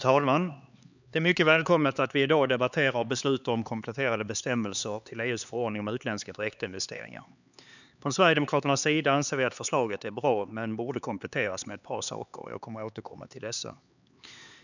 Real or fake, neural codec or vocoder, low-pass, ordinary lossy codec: fake; codec, 16 kHz, 4 kbps, X-Codec, HuBERT features, trained on LibriSpeech; 7.2 kHz; none